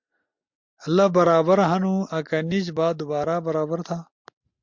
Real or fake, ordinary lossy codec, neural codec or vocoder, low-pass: real; MP3, 64 kbps; none; 7.2 kHz